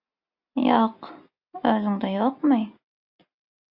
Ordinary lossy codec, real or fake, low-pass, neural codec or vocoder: AAC, 48 kbps; real; 5.4 kHz; none